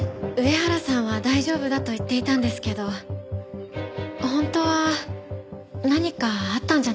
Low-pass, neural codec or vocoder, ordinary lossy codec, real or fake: none; none; none; real